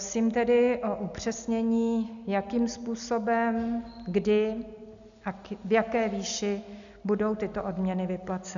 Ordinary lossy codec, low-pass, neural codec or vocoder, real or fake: MP3, 96 kbps; 7.2 kHz; none; real